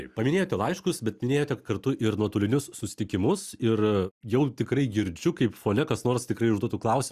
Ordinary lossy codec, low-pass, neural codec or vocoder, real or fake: Opus, 64 kbps; 14.4 kHz; none; real